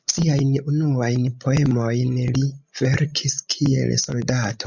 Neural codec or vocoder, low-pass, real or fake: codec, 16 kHz, 16 kbps, FreqCodec, larger model; 7.2 kHz; fake